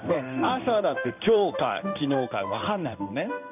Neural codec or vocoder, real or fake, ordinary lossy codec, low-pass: codec, 16 kHz in and 24 kHz out, 1 kbps, XY-Tokenizer; fake; none; 3.6 kHz